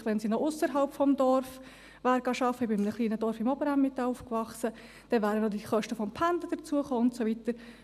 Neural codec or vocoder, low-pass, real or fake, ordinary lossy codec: none; 14.4 kHz; real; none